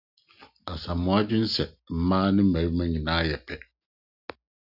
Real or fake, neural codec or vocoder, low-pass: real; none; 5.4 kHz